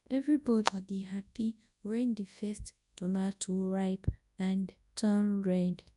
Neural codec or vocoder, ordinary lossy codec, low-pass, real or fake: codec, 24 kHz, 0.9 kbps, WavTokenizer, large speech release; none; 10.8 kHz; fake